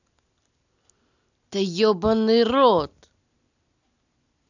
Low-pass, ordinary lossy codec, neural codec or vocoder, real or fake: 7.2 kHz; none; none; real